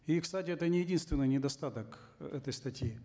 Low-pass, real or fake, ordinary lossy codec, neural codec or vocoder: none; real; none; none